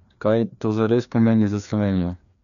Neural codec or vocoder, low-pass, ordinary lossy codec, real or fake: codec, 16 kHz, 2 kbps, FreqCodec, larger model; 7.2 kHz; none; fake